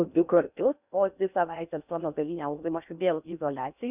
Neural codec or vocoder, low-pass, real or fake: codec, 16 kHz in and 24 kHz out, 0.6 kbps, FocalCodec, streaming, 4096 codes; 3.6 kHz; fake